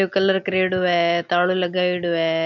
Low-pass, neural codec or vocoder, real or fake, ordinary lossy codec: 7.2 kHz; none; real; none